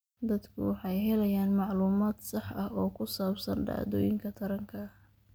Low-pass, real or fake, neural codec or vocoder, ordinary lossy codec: none; real; none; none